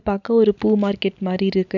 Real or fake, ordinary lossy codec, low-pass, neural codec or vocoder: real; none; 7.2 kHz; none